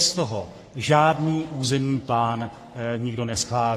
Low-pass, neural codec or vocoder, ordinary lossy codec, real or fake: 14.4 kHz; codec, 44.1 kHz, 3.4 kbps, Pupu-Codec; AAC, 48 kbps; fake